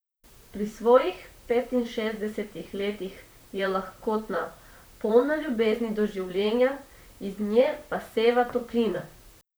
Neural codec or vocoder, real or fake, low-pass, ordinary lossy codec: vocoder, 44.1 kHz, 128 mel bands, Pupu-Vocoder; fake; none; none